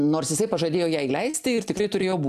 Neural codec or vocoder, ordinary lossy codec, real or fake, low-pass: none; AAC, 96 kbps; real; 14.4 kHz